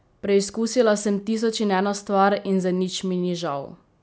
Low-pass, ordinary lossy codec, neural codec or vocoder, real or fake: none; none; none; real